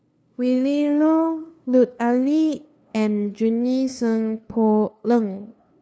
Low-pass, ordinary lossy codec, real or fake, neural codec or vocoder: none; none; fake; codec, 16 kHz, 2 kbps, FunCodec, trained on LibriTTS, 25 frames a second